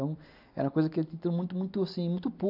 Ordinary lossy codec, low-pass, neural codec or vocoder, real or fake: AAC, 48 kbps; 5.4 kHz; none; real